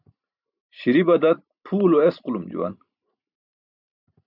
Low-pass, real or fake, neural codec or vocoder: 5.4 kHz; fake; vocoder, 44.1 kHz, 128 mel bands every 512 samples, BigVGAN v2